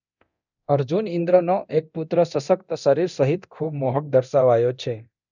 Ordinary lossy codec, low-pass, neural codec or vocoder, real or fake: none; 7.2 kHz; codec, 24 kHz, 0.9 kbps, DualCodec; fake